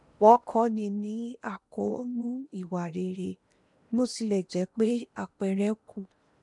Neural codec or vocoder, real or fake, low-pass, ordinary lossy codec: codec, 16 kHz in and 24 kHz out, 0.8 kbps, FocalCodec, streaming, 65536 codes; fake; 10.8 kHz; none